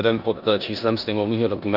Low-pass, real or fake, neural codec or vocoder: 5.4 kHz; fake; codec, 16 kHz in and 24 kHz out, 0.9 kbps, LongCat-Audio-Codec, four codebook decoder